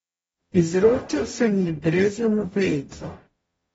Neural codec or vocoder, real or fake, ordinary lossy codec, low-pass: codec, 44.1 kHz, 0.9 kbps, DAC; fake; AAC, 24 kbps; 19.8 kHz